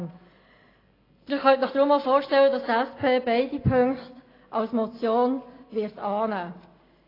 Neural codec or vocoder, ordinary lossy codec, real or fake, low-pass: none; AAC, 24 kbps; real; 5.4 kHz